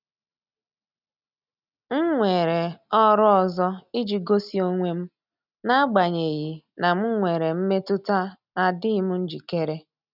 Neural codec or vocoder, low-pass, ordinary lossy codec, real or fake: none; 5.4 kHz; none; real